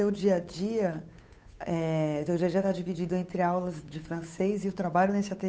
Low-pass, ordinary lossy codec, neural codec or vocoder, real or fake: none; none; codec, 16 kHz, 8 kbps, FunCodec, trained on Chinese and English, 25 frames a second; fake